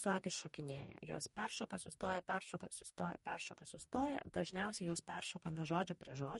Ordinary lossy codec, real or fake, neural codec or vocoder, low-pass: MP3, 48 kbps; fake; codec, 44.1 kHz, 2.6 kbps, DAC; 19.8 kHz